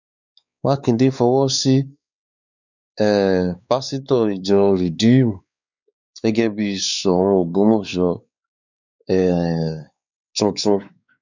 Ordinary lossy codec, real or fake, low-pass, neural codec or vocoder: none; fake; 7.2 kHz; codec, 16 kHz, 4 kbps, X-Codec, WavLM features, trained on Multilingual LibriSpeech